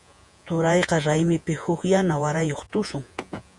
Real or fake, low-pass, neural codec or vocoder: fake; 10.8 kHz; vocoder, 48 kHz, 128 mel bands, Vocos